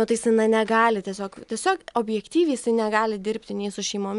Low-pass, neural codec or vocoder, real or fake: 10.8 kHz; none; real